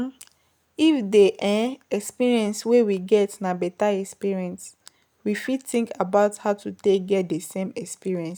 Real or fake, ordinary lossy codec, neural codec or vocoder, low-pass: real; none; none; none